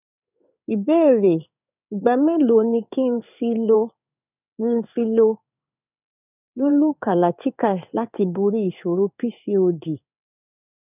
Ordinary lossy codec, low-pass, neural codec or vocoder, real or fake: none; 3.6 kHz; codec, 16 kHz in and 24 kHz out, 1 kbps, XY-Tokenizer; fake